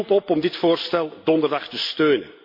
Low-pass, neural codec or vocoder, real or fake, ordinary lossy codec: 5.4 kHz; none; real; MP3, 32 kbps